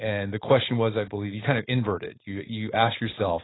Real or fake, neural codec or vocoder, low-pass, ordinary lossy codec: fake; codec, 16 kHz, 4.8 kbps, FACodec; 7.2 kHz; AAC, 16 kbps